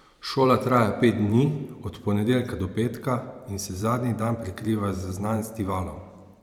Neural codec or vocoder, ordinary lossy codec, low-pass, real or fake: vocoder, 44.1 kHz, 128 mel bands every 512 samples, BigVGAN v2; none; 19.8 kHz; fake